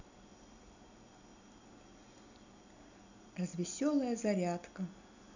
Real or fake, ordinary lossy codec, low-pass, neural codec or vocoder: real; none; 7.2 kHz; none